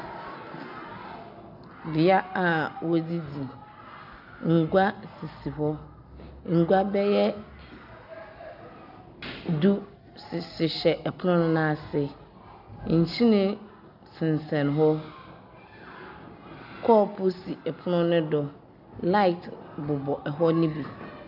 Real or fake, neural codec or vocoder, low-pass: real; none; 5.4 kHz